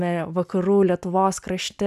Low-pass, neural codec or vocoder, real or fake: 14.4 kHz; none; real